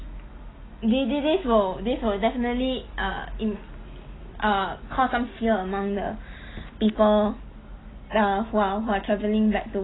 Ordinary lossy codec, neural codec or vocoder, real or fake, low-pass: AAC, 16 kbps; none; real; 7.2 kHz